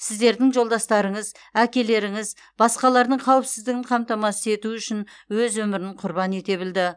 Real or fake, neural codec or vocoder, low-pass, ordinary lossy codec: real; none; 9.9 kHz; none